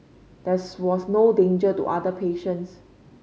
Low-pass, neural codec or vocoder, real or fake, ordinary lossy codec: none; none; real; none